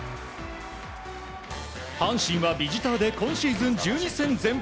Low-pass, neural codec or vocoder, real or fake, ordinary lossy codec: none; none; real; none